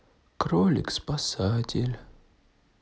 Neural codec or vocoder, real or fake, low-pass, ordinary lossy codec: none; real; none; none